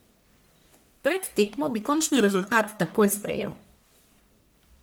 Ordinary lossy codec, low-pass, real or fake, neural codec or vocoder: none; none; fake; codec, 44.1 kHz, 1.7 kbps, Pupu-Codec